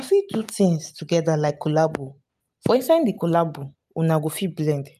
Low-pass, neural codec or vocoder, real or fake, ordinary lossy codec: 14.4 kHz; none; real; none